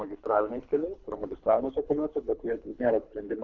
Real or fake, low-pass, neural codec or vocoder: fake; 7.2 kHz; codec, 24 kHz, 3 kbps, HILCodec